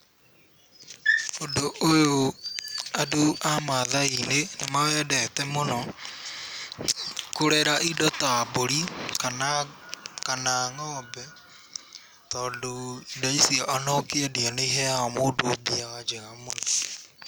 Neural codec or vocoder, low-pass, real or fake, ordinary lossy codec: none; none; real; none